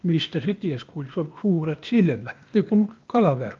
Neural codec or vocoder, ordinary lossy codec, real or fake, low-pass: codec, 16 kHz, 0.8 kbps, ZipCodec; Opus, 24 kbps; fake; 7.2 kHz